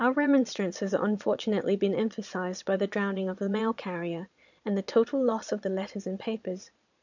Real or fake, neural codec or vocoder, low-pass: fake; vocoder, 22.05 kHz, 80 mel bands, Vocos; 7.2 kHz